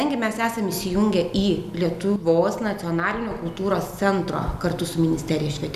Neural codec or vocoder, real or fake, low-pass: none; real; 14.4 kHz